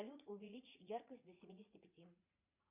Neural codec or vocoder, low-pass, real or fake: vocoder, 44.1 kHz, 80 mel bands, Vocos; 3.6 kHz; fake